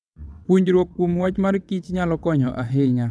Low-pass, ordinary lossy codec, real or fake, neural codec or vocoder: none; none; fake; vocoder, 22.05 kHz, 80 mel bands, WaveNeXt